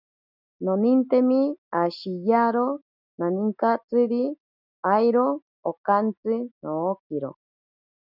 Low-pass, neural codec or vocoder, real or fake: 5.4 kHz; none; real